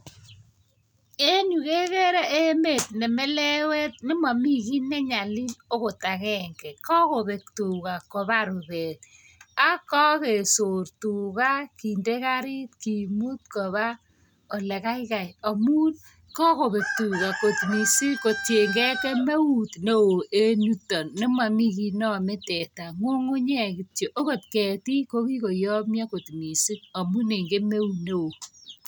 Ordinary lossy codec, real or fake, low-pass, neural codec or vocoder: none; real; none; none